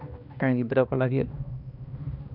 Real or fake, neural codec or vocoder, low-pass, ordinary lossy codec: fake; codec, 16 kHz, 1 kbps, X-Codec, HuBERT features, trained on balanced general audio; 5.4 kHz; none